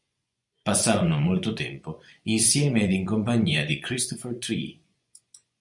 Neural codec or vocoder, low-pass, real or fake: vocoder, 24 kHz, 100 mel bands, Vocos; 10.8 kHz; fake